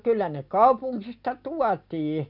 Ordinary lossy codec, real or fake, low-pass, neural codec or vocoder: none; real; 5.4 kHz; none